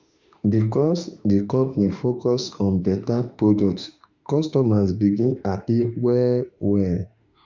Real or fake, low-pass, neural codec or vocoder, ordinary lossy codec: fake; 7.2 kHz; autoencoder, 48 kHz, 32 numbers a frame, DAC-VAE, trained on Japanese speech; Opus, 64 kbps